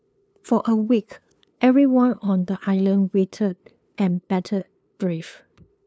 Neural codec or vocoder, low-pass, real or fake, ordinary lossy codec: codec, 16 kHz, 2 kbps, FunCodec, trained on LibriTTS, 25 frames a second; none; fake; none